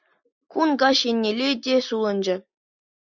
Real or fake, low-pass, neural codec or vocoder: real; 7.2 kHz; none